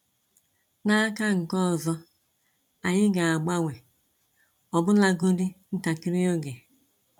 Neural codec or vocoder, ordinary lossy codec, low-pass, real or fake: vocoder, 44.1 kHz, 128 mel bands every 256 samples, BigVGAN v2; none; 19.8 kHz; fake